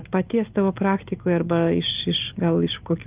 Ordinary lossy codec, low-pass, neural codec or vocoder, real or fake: Opus, 32 kbps; 3.6 kHz; none; real